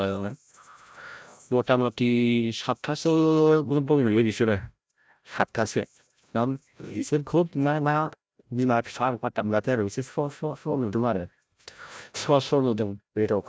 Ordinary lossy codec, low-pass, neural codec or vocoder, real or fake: none; none; codec, 16 kHz, 0.5 kbps, FreqCodec, larger model; fake